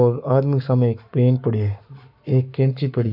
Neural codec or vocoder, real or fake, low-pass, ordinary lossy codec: codec, 44.1 kHz, 7.8 kbps, Pupu-Codec; fake; 5.4 kHz; none